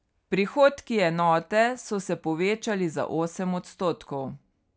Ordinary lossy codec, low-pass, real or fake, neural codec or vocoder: none; none; real; none